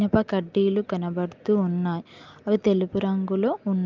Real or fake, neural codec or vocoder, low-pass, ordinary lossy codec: real; none; 7.2 kHz; Opus, 24 kbps